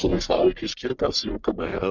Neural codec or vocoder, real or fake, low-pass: codec, 44.1 kHz, 1.7 kbps, Pupu-Codec; fake; 7.2 kHz